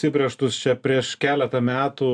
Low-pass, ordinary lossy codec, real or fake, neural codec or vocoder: 9.9 kHz; MP3, 96 kbps; real; none